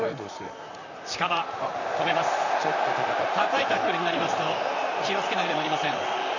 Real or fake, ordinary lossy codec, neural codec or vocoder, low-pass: fake; none; vocoder, 44.1 kHz, 128 mel bands, Pupu-Vocoder; 7.2 kHz